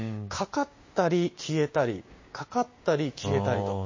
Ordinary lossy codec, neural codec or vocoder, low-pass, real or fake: MP3, 32 kbps; codec, 16 kHz, 6 kbps, DAC; 7.2 kHz; fake